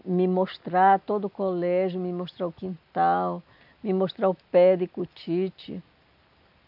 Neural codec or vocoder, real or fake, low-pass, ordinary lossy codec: none; real; 5.4 kHz; none